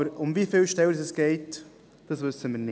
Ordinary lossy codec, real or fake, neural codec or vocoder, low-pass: none; real; none; none